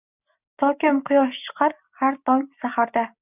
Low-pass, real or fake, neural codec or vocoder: 3.6 kHz; real; none